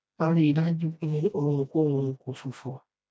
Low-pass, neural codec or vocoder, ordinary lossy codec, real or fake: none; codec, 16 kHz, 1 kbps, FreqCodec, smaller model; none; fake